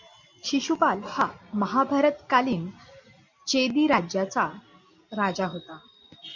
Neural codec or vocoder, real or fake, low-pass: none; real; 7.2 kHz